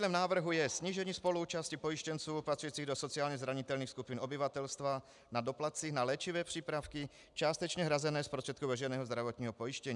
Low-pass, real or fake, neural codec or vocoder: 10.8 kHz; real; none